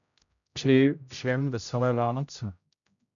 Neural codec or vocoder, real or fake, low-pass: codec, 16 kHz, 0.5 kbps, X-Codec, HuBERT features, trained on general audio; fake; 7.2 kHz